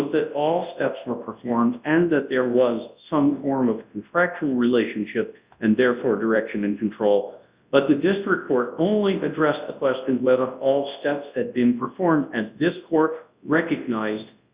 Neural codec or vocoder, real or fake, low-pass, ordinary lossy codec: codec, 24 kHz, 0.9 kbps, WavTokenizer, large speech release; fake; 3.6 kHz; Opus, 64 kbps